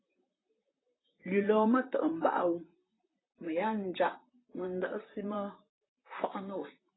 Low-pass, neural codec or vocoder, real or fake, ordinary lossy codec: 7.2 kHz; vocoder, 44.1 kHz, 128 mel bands, Pupu-Vocoder; fake; AAC, 16 kbps